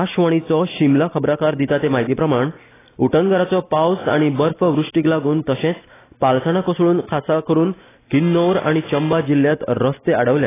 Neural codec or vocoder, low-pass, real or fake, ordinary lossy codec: none; 3.6 kHz; real; AAC, 16 kbps